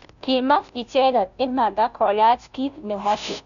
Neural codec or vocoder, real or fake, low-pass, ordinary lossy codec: codec, 16 kHz, 0.5 kbps, FunCodec, trained on Chinese and English, 25 frames a second; fake; 7.2 kHz; none